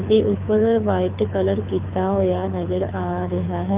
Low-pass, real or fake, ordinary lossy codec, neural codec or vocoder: 3.6 kHz; fake; Opus, 24 kbps; codec, 24 kHz, 6 kbps, HILCodec